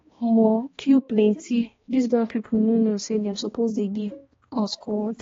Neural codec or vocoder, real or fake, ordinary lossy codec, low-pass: codec, 16 kHz, 1 kbps, X-Codec, HuBERT features, trained on balanced general audio; fake; AAC, 24 kbps; 7.2 kHz